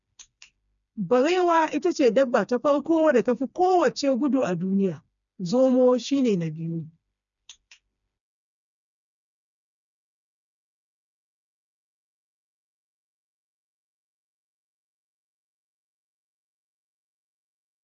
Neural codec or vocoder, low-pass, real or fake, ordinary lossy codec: codec, 16 kHz, 2 kbps, FreqCodec, smaller model; 7.2 kHz; fake; none